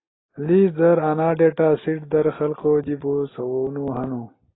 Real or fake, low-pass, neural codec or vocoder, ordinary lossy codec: real; 7.2 kHz; none; AAC, 16 kbps